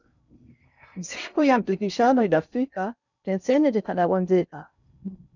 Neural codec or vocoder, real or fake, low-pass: codec, 16 kHz in and 24 kHz out, 0.6 kbps, FocalCodec, streaming, 4096 codes; fake; 7.2 kHz